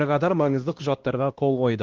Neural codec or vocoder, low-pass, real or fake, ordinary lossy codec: codec, 16 kHz, 1 kbps, X-Codec, WavLM features, trained on Multilingual LibriSpeech; 7.2 kHz; fake; Opus, 16 kbps